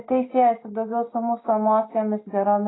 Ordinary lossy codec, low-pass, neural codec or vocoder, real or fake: AAC, 16 kbps; 7.2 kHz; none; real